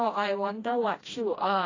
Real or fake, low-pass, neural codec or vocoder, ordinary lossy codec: fake; 7.2 kHz; codec, 16 kHz, 1 kbps, FreqCodec, smaller model; AAC, 32 kbps